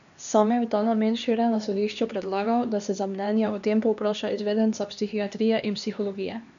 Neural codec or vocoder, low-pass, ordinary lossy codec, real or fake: codec, 16 kHz, 2 kbps, X-Codec, HuBERT features, trained on LibriSpeech; 7.2 kHz; none; fake